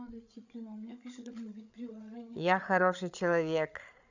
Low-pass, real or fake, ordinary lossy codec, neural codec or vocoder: 7.2 kHz; fake; none; codec, 16 kHz, 8 kbps, FreqCodec, larger model